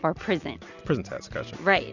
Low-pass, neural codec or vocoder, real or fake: 7.2 kHz; none; real